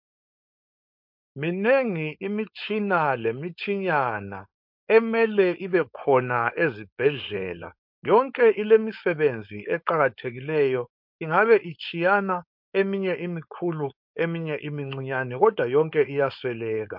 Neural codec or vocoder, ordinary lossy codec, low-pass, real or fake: codec, 16 kHz, 4.8 kbps, FACodec; MP3, 48 kbps; 5.4 kHz; fake